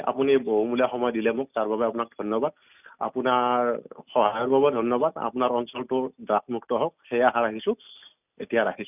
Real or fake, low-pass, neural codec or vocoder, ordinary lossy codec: real; 3.6 kHz; none; none